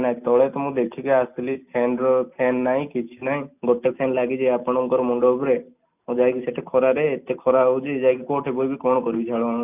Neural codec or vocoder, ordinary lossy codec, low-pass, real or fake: none; none; 3.6 kHz; real